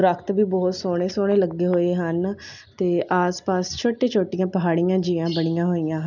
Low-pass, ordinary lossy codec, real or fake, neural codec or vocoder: 7.2 kHz; none; real; none